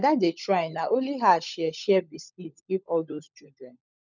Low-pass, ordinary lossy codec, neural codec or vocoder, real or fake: 7.2 kHz; none; codec, 16 kHz, 16 kbps, FunCodec, trained on LibriTTS, 50 frames a second; fake